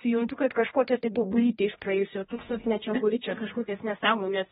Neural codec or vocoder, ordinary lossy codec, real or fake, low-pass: codec, 16 kHz, 1 kbps, X-Codec, HuBERT features, trained on general audio; AAC, 16 kbps; fake; 7.2 kHz